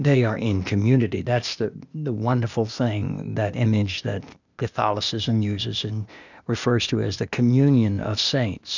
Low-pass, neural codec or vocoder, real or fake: 7.2 kHz; codec, 16 kHz, 0.8 kbps, ZipCodec; fake